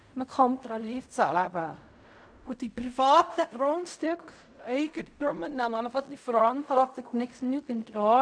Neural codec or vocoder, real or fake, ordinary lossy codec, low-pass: codec, 16 kHz in and 24 kHz out, 0.4 kbps, LongCat-Audio-Codec, fine tuned four codebook decoder; fake; MP3, 96 kbps; 9.9 kHz